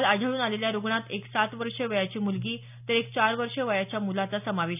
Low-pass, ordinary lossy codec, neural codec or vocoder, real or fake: 3.6 kHz; none; none; real